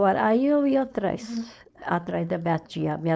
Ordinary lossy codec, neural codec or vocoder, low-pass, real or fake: none; codec, 16 kHz, 4.8 kbps, FACodec; none; fake